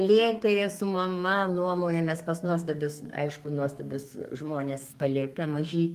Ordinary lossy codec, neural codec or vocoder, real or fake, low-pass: Opus, 32 kbps; codec, 32 kHz, 1.9 kbps, SNAC; fake; 14.4 kHz